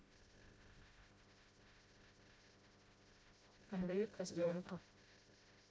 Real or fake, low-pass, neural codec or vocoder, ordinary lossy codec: fake; none; codec, 16 kHz, 0.5 kbps, FreqCodec, smaller model; none